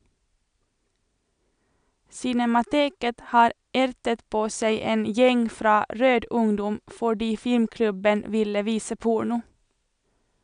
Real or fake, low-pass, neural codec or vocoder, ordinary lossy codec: real; 9.9 kHz; none; MP3, 96 kbps